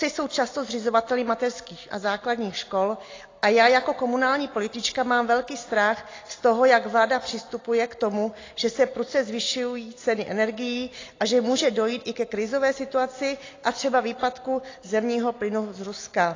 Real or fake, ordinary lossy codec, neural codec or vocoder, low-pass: real; AAC, 32 kbps; none; 7.2 kHz